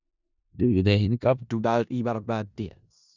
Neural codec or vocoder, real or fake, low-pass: codec, 16 kHz in and 24 kHz out, 0.4 kbps, LongCat-Audio-Codec, four codebook decoder; fake; 7.2 kHz